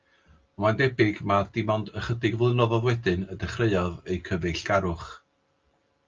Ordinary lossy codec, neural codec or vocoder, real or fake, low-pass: Opus, 24 kbps; none; real; 7.2 kHz